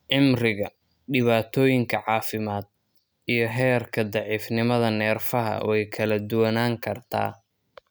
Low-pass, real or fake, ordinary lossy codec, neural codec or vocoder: none; real; none; none